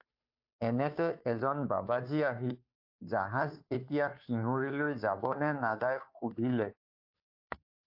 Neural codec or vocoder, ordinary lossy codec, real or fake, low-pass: codec, 16 kHz, 2 kbps, FunCodec, trained on Chinese and English, 25 frames a second; AAC, 48 kbps; fake; 5.4 kHz